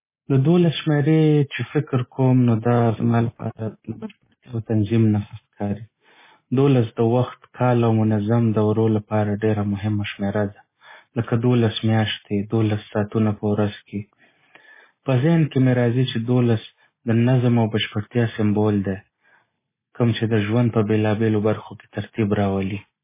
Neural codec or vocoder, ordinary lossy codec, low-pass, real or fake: none; MP3, 16 kbps; 3.6 kHz; real